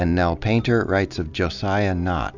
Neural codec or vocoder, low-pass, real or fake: none; 7.2 kHz; real